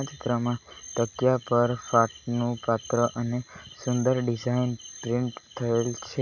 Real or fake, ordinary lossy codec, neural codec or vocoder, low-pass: real; none; none; 7.2 kHz